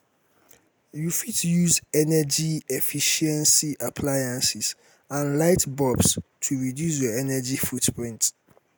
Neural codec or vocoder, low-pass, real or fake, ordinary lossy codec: none; none; real; none